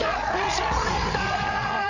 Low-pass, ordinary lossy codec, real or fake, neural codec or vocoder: 7.2 kHz; none; fake; codec, 16 kHz, 8 kbps, FreqCodec, larger model